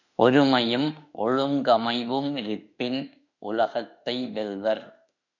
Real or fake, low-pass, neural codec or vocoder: fake; 7.2 kHz; autoencoder, 48 kHz, 32 numbers a frame, DAC-VAE, trained on Japanese speech